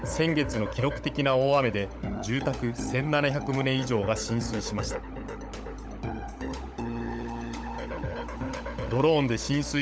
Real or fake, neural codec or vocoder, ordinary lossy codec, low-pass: fake; codec, 16 kHz, 16 kbps, FunCodec, trained on LibriTTS, 50 frames a second; none; none